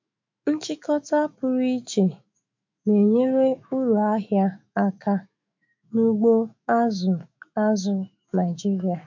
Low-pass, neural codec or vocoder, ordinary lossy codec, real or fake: 7.2 kHz; autoencoder, 48 kHz, 128 numbers a frame, DAC-VAE, trained on Japanese speech; MP3, 64 kbps; fake